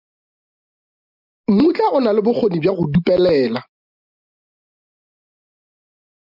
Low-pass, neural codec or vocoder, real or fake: 5.4 kHz; none; real